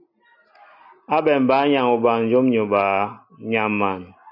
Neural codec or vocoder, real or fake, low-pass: none; real; 5.4 kHz